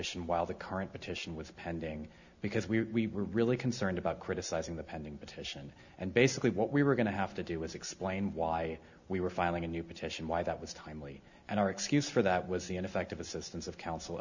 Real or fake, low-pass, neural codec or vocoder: real; 7.2 kHz; none